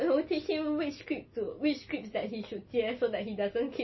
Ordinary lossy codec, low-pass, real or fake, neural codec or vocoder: MP3, 24 kbps; 7.2 kHz; real; none